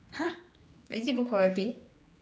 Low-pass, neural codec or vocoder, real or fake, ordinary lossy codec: none; codec, 16 kHz, 2 kbps, X-Codec, HuBERT features, trained on general audio; fake; none